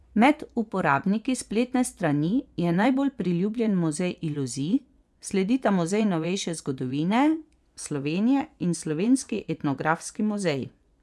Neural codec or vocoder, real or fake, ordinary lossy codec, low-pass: vocoder, 24 kHz, 100 mel bands, Vocos; fake; none; none